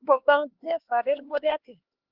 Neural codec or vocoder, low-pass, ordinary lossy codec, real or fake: codec, 16 kHz, 2 kbps, X-Codec, HuBERT features, trained on LibriSpeech; 5.4 kHz; Opus, 16 kbps; fake